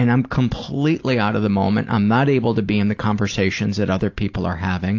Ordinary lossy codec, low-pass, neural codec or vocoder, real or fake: AAC, 48 kbps; 7.2 kHz; none; real